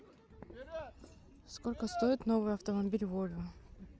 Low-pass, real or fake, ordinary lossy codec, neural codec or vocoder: none; real; none; none